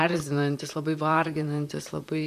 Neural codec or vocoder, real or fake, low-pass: vocoder, 44.1 kHz, 128 mel bands, Pupu-Vocoder; fake; 14.4 kHz